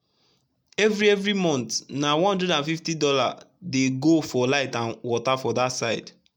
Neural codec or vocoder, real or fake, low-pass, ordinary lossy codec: none; real; 19.8 kHz; MP3, 96 kbps